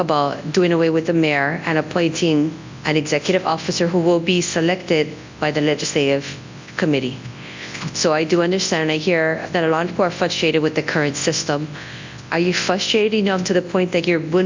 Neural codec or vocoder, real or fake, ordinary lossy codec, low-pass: codec, 24 kHz, 0.9 kbps, WavTokenizer, large speech release; fake; MP3, 64 kbps; 7.2 kHz